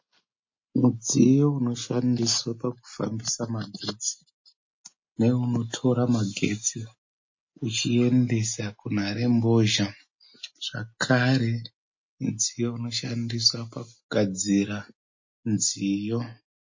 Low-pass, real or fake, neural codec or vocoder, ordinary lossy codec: 7.2 kHz; real; none; MP3, 32 kbps